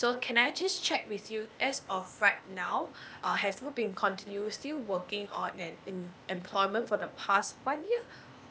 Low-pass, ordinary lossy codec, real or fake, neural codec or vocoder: none; none; fake; codec, 16 kHz, 0.8 kbps, ZipCodec